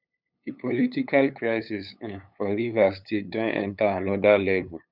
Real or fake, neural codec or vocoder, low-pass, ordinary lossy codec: fake; codec, 16 kHz, 8 kbps, FunCodec, trained on LibriTTS, 25 frames a second; 5.4 kHz; none